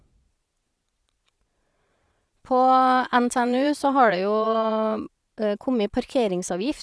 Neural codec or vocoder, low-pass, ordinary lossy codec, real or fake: vocoder, 22.05 kHz, 80 mel bands, Vocos; none; none; fake